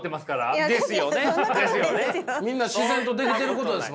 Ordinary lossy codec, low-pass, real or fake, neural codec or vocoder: none; none; real; none